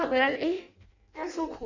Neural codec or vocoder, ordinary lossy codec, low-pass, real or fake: codec, 16 kHz in and 24 kHz out, 0.6 kbps, FireRedTTS-2 codec; none; 7.2 kHz; fake